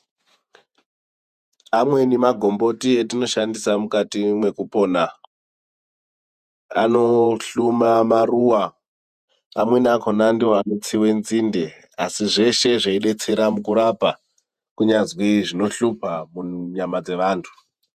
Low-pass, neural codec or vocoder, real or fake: 14.4 kHz; vocoder, 48 kHz, 128 mel bands, Vocos; fake